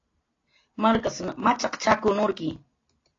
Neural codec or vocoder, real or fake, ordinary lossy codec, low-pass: none; real; AAC, 32 kbps; 7.2 kHz